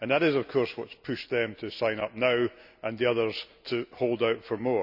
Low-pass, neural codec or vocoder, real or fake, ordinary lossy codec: 5.4 kHz; none; real; none